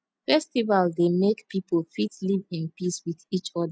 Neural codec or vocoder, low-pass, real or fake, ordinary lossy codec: none; none; real; none